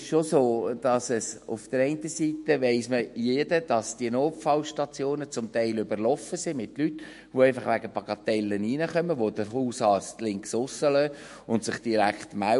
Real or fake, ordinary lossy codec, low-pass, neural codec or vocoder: fake; MP3, 48 kbps; 14.4 kHz; autoencoder, 48 kHz, 128 numbers a frame, DAC-VAE, trained on Japanese speech